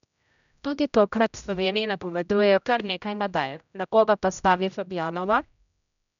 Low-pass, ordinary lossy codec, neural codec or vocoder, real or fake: 7.2 kHz; none; codec, 16 kHz, 0.5 kbps, X-Codec, HuBERT features, trained on general audio; fake